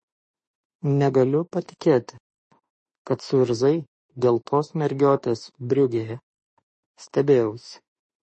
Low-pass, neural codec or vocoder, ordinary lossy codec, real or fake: 10.8 kHz; autoencoder, 48 kHz, 32 numbers a frame, DAC-VAE, trained on Japanese speech; MP3, 32 kbps; fake